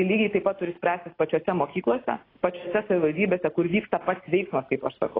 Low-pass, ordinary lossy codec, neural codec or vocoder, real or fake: 5.4 kHz; AAC, 24 kbps; none; real